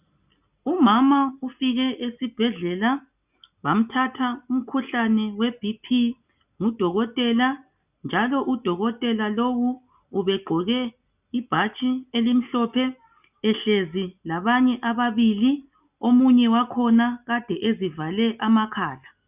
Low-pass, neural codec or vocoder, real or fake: 3.6 kHz; none; real